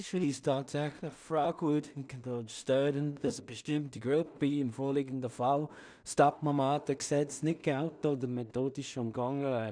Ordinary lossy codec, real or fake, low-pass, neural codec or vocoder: none; fake; 9.9 kHz; codec, 16 kHz in and 24 kHz out, 0.4 kbps, LongCat-Audio-Codec, two codebook decoder